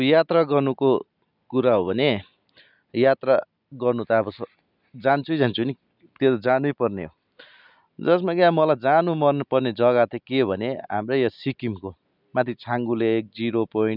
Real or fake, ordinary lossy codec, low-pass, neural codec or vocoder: real; none; 5.4 kHz; none